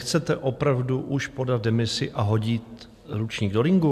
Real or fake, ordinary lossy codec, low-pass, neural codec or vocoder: real; AAC, 96 kbps; 14.4 kHz; none